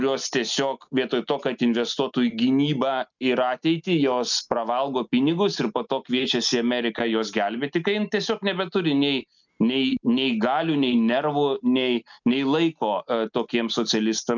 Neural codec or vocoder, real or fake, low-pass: none; real; 7.2 kHz